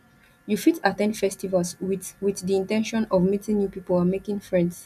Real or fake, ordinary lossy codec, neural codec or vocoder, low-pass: real; none; none; 14.4 kHz